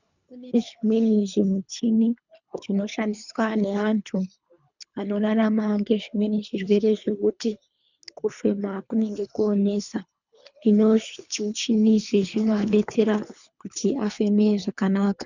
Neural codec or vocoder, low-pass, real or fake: codec, 24 kHz, 3 kbps, HILCodec; 7.2 kHz; fake